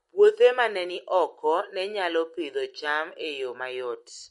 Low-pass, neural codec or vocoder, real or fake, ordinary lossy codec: 19.8 kHz; none; real; MP3, 48 kbps